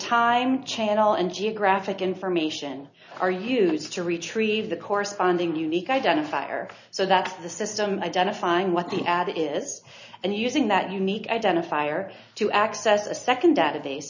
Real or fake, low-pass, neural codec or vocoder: real; 7.2 kHz; none